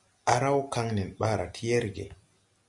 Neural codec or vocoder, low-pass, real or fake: none; 10.8 kHz; real